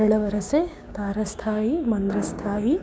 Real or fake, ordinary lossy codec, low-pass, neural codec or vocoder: fake; none; none; codec, 16 kHz, 6 kbps, DAC